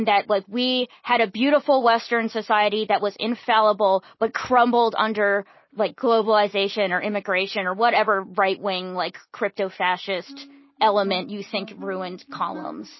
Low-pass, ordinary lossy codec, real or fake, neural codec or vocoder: 7.2 kHz; MP3, 24 kbps; real; none